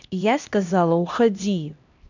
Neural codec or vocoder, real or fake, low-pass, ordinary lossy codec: codec, 16 kHz, 1 kbps, X-Codec, HuBERT features, trained on LibriSpeech; fake; 7.2 kHz; none